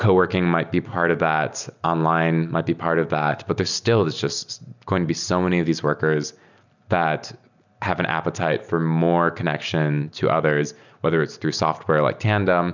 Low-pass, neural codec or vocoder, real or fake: 7.2 kHz; none; real